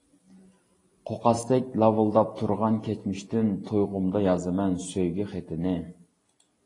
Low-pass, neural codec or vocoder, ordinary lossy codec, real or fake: 10.8 kHz; none; AAC, 32 kbps; real